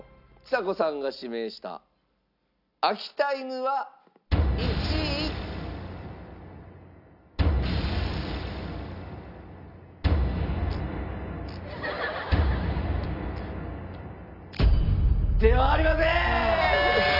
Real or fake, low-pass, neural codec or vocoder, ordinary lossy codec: real; 5.4 kHz; none; none